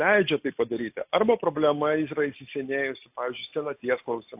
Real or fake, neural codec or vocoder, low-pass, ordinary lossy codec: real; none; 3.6 kHz; AAC, 32 kbps